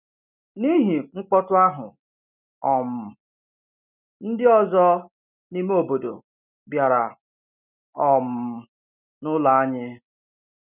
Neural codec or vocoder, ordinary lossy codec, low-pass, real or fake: none; none; 3.6 kHz; real